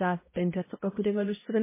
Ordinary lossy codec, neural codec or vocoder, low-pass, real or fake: MP3, 16 kbps; codec, 16 kHz, 0.5 kbps, X-Codec, HuBERT features, trained on balanced general audio; 3.6 kHz; fake